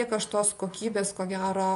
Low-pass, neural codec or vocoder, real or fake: 10.8 kHz; vocoder, 24 kHz, 100 mel bands, Vocos; fake